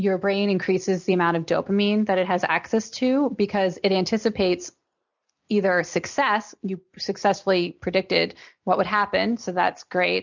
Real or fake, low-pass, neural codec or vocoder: real; 7.2 kHz; none